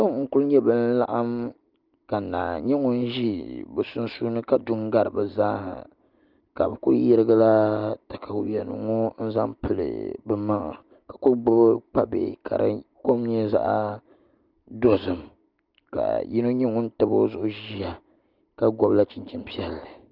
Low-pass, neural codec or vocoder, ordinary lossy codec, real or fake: 5.4 kHz; none; Opus, 32 kbps; real